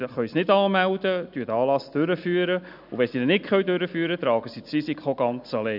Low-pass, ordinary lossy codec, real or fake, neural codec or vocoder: 5.4 kHz; none; real; none